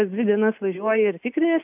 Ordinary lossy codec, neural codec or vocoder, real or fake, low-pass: AAC, 32 kbps; none; real; 3.6 kHz